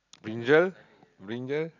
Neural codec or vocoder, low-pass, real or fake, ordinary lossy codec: none; 7.2 kHz; real; none